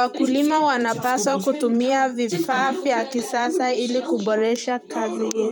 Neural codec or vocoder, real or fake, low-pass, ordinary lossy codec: vocoder, 44.1 kHz, 128 mel bands, Pupu-Vocoder; fake; none; none